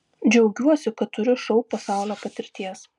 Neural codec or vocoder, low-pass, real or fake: none; 10.8 kHz; real